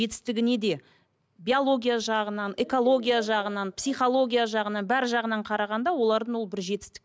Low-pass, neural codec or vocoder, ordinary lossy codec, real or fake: none; none; none; real